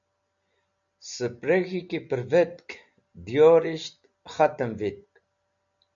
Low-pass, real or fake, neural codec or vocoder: 7.2 kHz; real; none